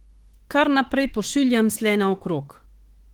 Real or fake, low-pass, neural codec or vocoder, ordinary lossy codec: fake; 19.8 kHz; autoencoder, 48 kHz, 32 numbers a frame, DAC-VAE, trained on Japanese speech; Opus, 16 kbps